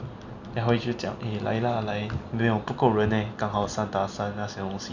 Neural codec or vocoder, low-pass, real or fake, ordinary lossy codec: none; 7.2 kHz; real; none